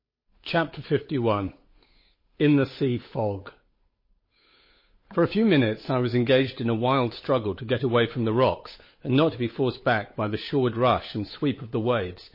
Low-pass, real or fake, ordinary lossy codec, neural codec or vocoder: 5.4 kHz; fake; MP3, 24 kbps; codec, 16 kHz, 8 kbps, FunCodec, trained on Chinese and English, 25 frames a second